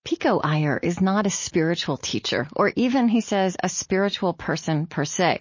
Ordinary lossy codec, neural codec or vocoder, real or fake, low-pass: MP3, 32 kbps; none; real; 7.2 kHz